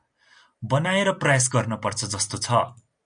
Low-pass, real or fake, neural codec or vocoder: 9.9 kHz; real; none